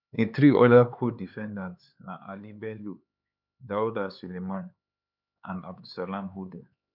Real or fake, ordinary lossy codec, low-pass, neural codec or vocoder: fake; none; 5.4 kHz; codec, 16 kHz, 4 kbps, X-Codec, HuBERT features, trained on LibriSpeech